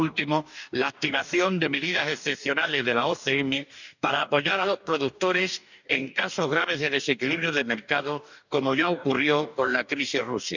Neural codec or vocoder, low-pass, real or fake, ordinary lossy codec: codec, 44.1 kHz, 2.6 kbps, DAC; 7.2 kHz; fake; none